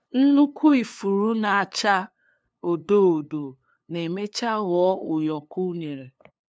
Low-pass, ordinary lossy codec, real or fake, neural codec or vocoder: none; none; fake; codec, 16 kHz, 2 kbps, FunCodec, trained on LibriTTS, 25 frames a second